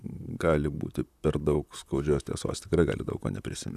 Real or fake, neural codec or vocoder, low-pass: fake; vocoder, 44.1 kHz, 128 mel bands, Pupu-Vocoder; 14.4 kHz